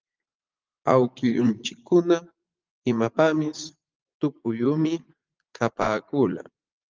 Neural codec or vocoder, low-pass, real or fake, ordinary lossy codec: vocoder, 44.1 kHz, 80 mel bands, Vocos; 7.2 kHz; fake; Opus, 32 kbps